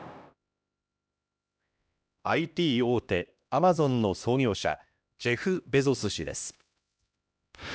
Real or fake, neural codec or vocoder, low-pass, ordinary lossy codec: fake; codec, 16 kHz, 1 kbps, X-Codec, HuBERT features, trained on LibriSpeech; none; none